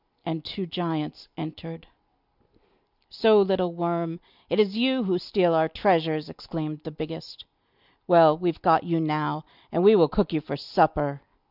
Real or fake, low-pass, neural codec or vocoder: real; 5.4 kHz; none